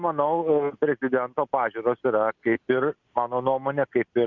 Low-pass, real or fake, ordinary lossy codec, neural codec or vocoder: 7.2 kHz; real; Opus, 64 kbps; none